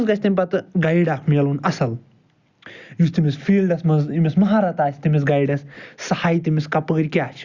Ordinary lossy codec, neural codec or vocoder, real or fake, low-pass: Opus, 64 kbps; none; real; 7.2 kHz